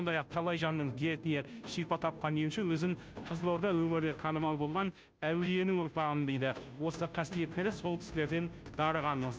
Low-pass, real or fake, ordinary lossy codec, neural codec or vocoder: none; fake; none; codec, 16 kHz, 0.5 kbps, FunCodec, trained on Chinese and English, 25 frames a second